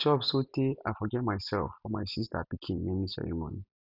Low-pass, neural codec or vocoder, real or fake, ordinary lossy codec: 5.4 kHz; none; real; none